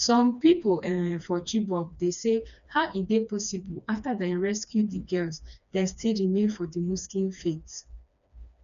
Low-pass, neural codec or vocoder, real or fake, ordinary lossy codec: 7.2 kHz; codec, 16 kHz, 2 kbps, FreqCodec, smaller model; fake; none